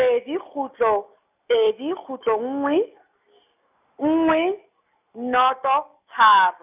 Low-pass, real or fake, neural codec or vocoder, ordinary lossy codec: 3.6 kHz; real; none; none